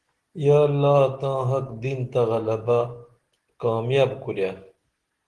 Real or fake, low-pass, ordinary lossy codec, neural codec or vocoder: real; 10.8 kHz; Opus, 16 kbps; none